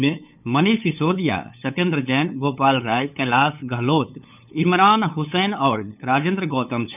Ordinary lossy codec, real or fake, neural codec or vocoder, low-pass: none; fake; codec, 16 kHz, 8 kbps, FunCodec, trained on LibriTTS, 25 frames a second; 3.6 kHz